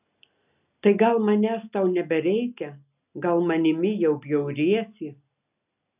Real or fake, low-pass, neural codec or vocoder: real; 3.6 kHz; none